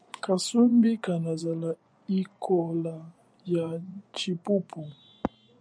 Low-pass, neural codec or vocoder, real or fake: 9.9 kHz; vocoder, 24 kHz, 100 mel bands, Vocos; fake